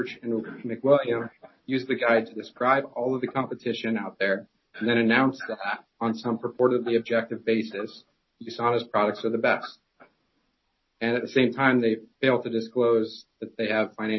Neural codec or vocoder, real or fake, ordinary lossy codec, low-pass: none; real; MP3, 24 kbps; 7.2 kHz